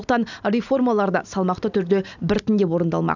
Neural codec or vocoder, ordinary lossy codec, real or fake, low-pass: none; none; real; 7.2 kHz